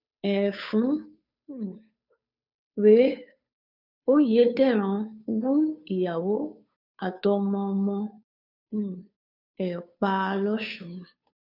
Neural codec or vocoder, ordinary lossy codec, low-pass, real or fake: codec, 16 kHz, 2 kbps, FunCodec, trained on Chinese and English, 25 frames a second; none; 5.4 kHz; fake